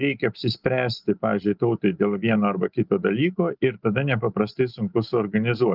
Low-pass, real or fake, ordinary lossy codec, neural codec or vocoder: 5.4 kHz; real; Opus, 32 kbps; none